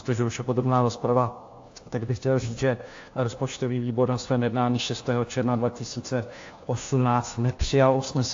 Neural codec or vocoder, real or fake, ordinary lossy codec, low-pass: codec, 16 kHz, 1 kbps, FunCodec, trained on LibriTTS, 50 frames a second; fake; AAC, 48 kbps; 7.2 kHz